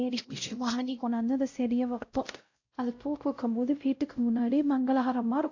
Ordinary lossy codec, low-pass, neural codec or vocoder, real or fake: AAC, 48 kbps; 7.2 kHz; codec, 16 kHz, 0.5 kbps, X-Codec, WavLM features, trained on Multilingual LibriSpeech; fake